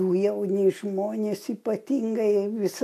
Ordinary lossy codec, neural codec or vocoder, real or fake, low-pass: AAC, 64 kbps; none; real; 14.4 kHz